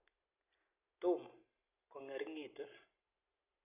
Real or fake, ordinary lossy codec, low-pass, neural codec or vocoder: real; AAC, 32 kbps; 3.6 kHz; none